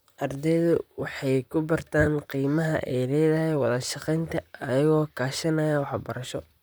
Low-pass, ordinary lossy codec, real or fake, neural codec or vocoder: none; none; fake; vocoder, 44.1 kHz, 128 mel bands, Pupu-Vocoder